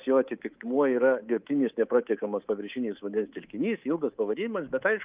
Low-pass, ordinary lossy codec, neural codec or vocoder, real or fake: 3.6 kHz; Opus, 24 kbps; codec, 24 kHz, 3.1 kbps, DualCodec; fake